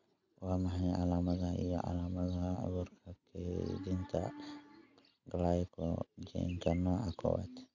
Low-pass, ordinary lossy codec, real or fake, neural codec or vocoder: 7.2 kHz; none; real; none